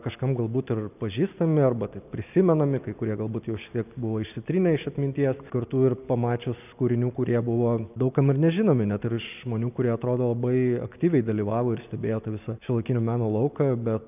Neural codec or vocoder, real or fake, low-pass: none; real; 3.6 kHz